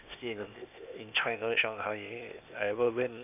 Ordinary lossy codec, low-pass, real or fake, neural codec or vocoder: none; 3.6 kHz; fake; codec, 16 kHz, 0.8 kbps, ZipCodec